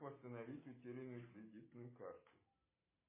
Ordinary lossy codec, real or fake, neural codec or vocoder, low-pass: MP3, 16 kbps; real; none; 3.6 kHz